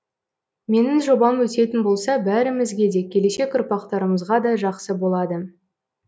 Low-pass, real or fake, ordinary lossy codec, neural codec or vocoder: none; real; none; none